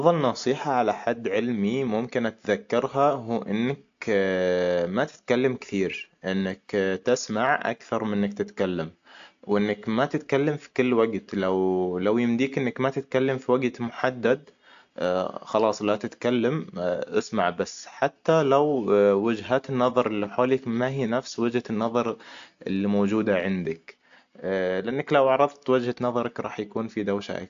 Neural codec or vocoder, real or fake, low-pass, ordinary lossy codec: none; real; 7.2 kHz; AAC, 64 kbps